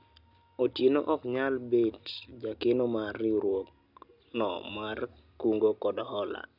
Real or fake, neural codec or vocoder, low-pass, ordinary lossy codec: real; none; 5.4 kHz; none